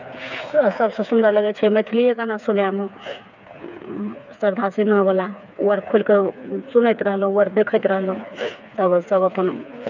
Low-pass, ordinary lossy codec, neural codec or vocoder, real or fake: 7.2 kHz; none; codec, 16 kHz, 4 kbps, FreqCodec, smaller model; fake